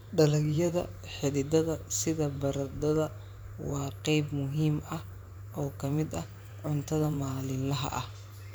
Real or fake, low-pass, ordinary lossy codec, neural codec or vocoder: fake; none; none; vocoder, 44.1 kHz, 128 mel bands every 512 samples, BigVGAN v2